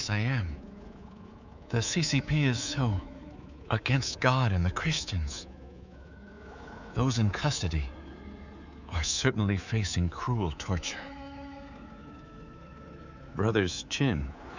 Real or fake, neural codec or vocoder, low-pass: fake; codec, 24 kHz, 3.1 kbps, DualCodec; 7.2 kHz